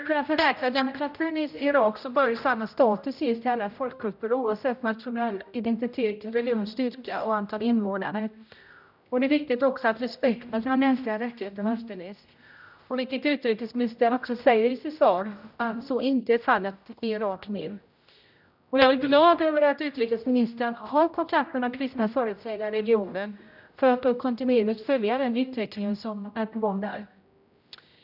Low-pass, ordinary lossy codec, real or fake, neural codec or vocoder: 5.4 kHz; none; fake; codec, 16 kHz, 0.5 kbps, X-Codec, HuBERT features, trained on general audio